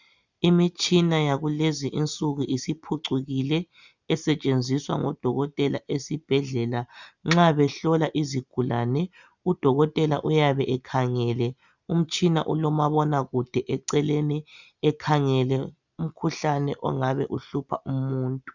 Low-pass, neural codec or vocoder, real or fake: 7.2 kHz; none; real